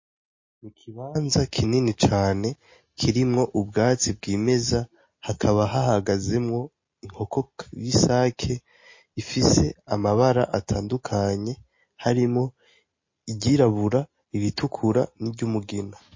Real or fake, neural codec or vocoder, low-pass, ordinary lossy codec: real; none; 7.2 kHz; MP3, 32 kbps